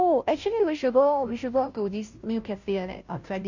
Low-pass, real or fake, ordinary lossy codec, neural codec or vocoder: 7.2 kHz; fake; none; codec, 16 kHz, 0.5 kbps, FunCodec, trained on Chinese and English, 25 frames a second